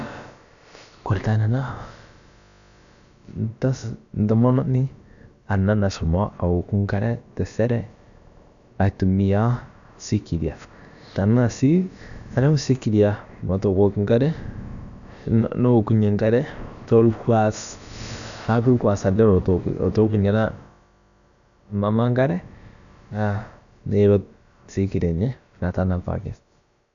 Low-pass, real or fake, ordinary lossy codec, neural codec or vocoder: 7.2 kHz; fake; none; codec, 16 kHz, about 1 kbps, DyCAST, with the encoder's durations